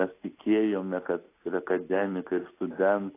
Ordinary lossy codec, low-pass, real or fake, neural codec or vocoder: AAC, 24 kbps; 3.6 kHz; real; none